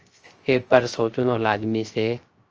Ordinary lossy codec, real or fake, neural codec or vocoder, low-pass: Opus, 24 kbps; fake; codec, 16 kHz, 0.3 kbps, FocalCodec; 7.2 kHz